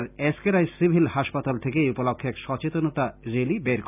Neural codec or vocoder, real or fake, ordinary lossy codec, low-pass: none; real; none; 3.6 kHz